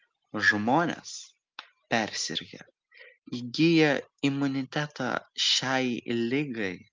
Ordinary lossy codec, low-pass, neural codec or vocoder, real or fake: Opus, 24 kbps; 7.2 kHz; none; real